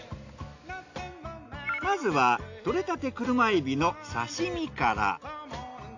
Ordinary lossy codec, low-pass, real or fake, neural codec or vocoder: AAC, 48 kbps; 7.2 kHz; real; none